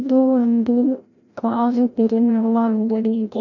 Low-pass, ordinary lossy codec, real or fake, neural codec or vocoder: 7.2 kHz; none; fake; codec, 16 kHz, 0.5 kbps, FreqCodec, larger model